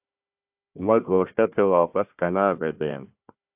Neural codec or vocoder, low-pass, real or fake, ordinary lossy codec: codec, 16 kHz, 1 kbps, FunCodec, trained on Chinese and English, 50 frames a second; 3.6 kHz; fake; AAC, 32 kbps